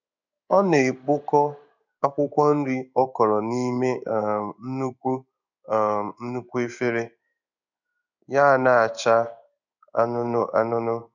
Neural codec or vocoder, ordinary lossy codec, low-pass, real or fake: codec, 16 kHz in and 24 kHz out, 1 kbps, XY-Tokenizer; none; 7.2 kHz; fake